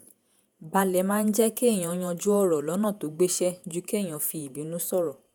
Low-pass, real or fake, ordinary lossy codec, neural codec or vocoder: none; fake; none; vocoder, 48 kHz, 128 mel bands, Vocos